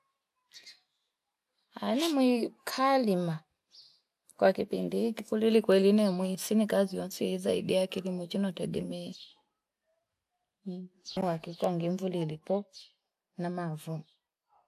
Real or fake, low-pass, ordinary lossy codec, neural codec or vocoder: real; 14.4 kHz; none; none